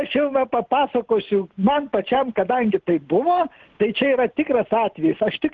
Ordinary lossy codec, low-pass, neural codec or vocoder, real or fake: Opus, 16 kbps; 7.2 kHz; none; real